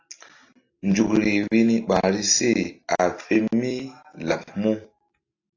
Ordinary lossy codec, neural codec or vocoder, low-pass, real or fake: AAC, 48 kbps; none; 7.2 kHz; real